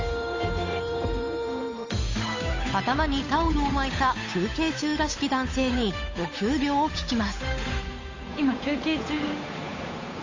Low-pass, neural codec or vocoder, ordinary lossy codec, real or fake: 7.2 kHz; codec, 16 kHz, 8 kbps, FunCodec, trained on Chinese and English, 25 frames a second; AAC, 32 kbps; fake